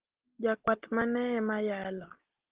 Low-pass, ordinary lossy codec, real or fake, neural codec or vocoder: 3.6 kHz; Opus, 16 kbps; real; none